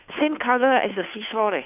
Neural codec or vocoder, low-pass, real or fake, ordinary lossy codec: codec, 16 kHz, 2 kbps, FunCodec, trained on Chinese and English, 25 frames a second; 3.6 kHz; fake; none